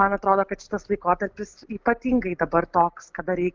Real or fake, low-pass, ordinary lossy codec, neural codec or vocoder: real; 7.2 kHz; Opus, 32 kbps; none